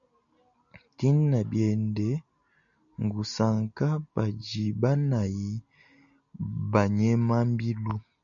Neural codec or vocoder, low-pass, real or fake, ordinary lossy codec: none; 7.2 kHz; real; MP3, 96 kbps